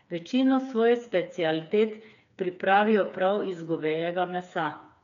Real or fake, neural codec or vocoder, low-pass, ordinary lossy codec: fake; codec, 16 kHz, 4 kbps, FreqCodec, smaller model; 7.2 kHz; none